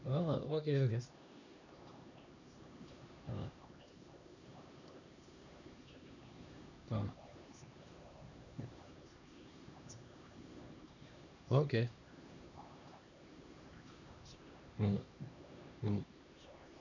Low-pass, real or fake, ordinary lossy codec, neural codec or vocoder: 7.2 kHz; fake; none; codec, 16 kHz, 2 kbps, X-Codec, HuBERT features, trained on LibriSpeech